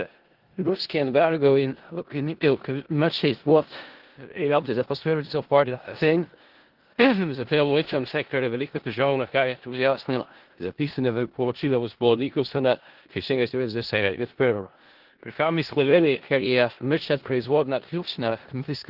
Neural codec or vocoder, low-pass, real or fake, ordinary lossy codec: codec, 16 kHz in and 24 kHz out, 0.4 kbps, LongCat-Audio-Codec, four codebook decoder; 5.4 kHz; fake; Opus, 16 kbps